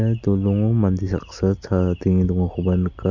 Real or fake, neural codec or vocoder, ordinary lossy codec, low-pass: real; none; none; 7.2 kHz